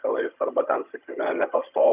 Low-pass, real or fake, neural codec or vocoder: 3.6 kHz; fake; vocoder, 22.05 kHz, 80 mel bands, HiFi-GAN